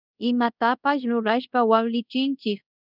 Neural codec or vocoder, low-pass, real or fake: codec, 24 kHz, 0.5 kbps, DualCodec; 5.4 kHz; fake